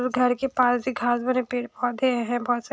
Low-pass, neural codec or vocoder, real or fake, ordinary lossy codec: none; none; real; none